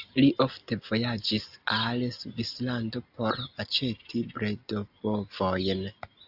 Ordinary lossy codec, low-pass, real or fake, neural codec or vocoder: AAC, 48 kbps; 5.4 kHz; real; none